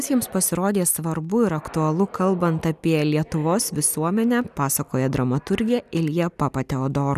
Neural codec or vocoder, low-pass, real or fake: none; 14.4 kHz; real